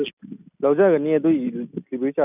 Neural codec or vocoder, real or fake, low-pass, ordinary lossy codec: none; real; 3.6 kHz; none